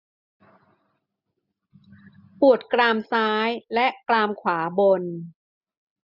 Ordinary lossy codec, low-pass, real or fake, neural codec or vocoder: none; 5.4 kHz; real; none